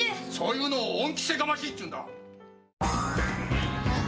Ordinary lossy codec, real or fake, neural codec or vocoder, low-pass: none; real; none; none